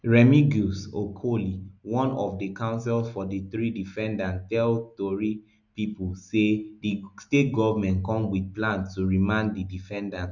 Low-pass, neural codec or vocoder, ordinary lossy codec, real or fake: 7.2 kHz; none; none; real